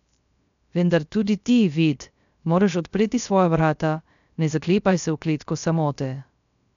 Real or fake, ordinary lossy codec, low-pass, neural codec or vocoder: fake; none; 7.2 kHz; codec, 16 kHz, 0.3 kbps, FocalCodec